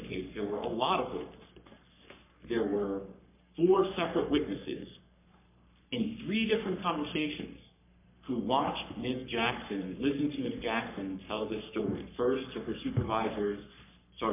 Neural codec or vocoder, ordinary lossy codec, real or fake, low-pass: codec, 44.1 kHz, 3.4 kbps, Pupu-Codec; MP3, 32 kbps; fake; 3.6 kHz